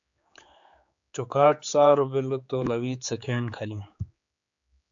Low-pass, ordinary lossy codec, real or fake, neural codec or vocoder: 7.2 kHz; AAC, 64 kbps; fake; codec, 16 kHz, 4 kbps, X-Codec, HuBERT features, trained on general audio